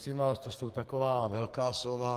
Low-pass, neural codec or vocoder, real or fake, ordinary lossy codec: 14.4 kHz; codec, 44.1 kHz, 2.6 kbps, SNAC; fake; Opus, 32 kbps